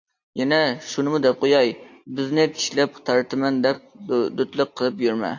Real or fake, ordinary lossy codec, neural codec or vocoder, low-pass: real; AAC, 48 kbps; none; 7.2 kHz